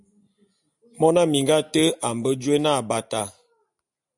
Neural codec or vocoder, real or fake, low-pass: none; real; 10.8 kHz